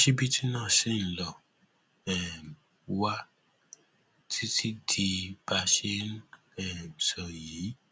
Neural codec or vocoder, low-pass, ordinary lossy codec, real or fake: none; none; none; real